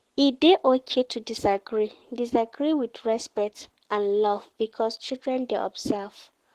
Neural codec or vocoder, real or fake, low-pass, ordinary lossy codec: codec, 44.1 kHz, 7.8 kbps, Pupu-Codec; fake; 14.4 kHz; Opus, 16 kbps